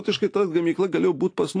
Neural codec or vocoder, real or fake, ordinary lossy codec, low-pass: none; real; AAC, 48 kbps; 9.9 kHz